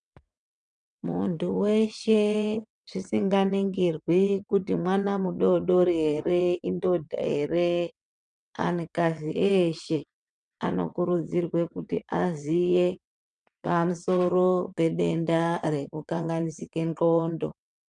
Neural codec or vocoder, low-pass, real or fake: vocoder, 22.05 kHz, 80 mel bands, WaveNeXt; 9.9 kHz; fake